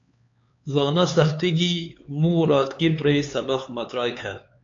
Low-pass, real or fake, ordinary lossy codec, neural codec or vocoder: 7.2 kHz; fake; AAC, 48 kbps; codec, 16 kHz, 4 kbps, X-Codec, HuBERT features, trained on LibriSpeech